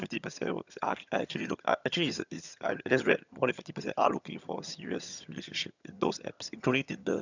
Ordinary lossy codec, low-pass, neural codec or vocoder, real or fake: none; 7.2 kHz; vocoder, 22.05 kHz, 80 mel bands, HiFi-GAN; fake